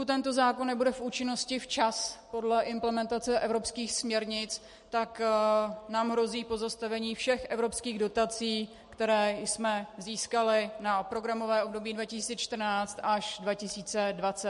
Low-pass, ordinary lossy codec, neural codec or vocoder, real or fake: 14.4 kHz; MP3, 48 kbps; none; real